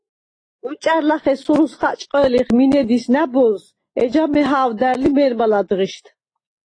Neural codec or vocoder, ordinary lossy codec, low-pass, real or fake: none; AAC, 32 kbps; 9.9 kHz; real